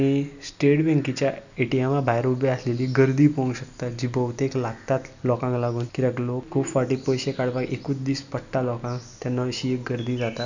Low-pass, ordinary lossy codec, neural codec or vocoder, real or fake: 7.2 kHz; none; none; real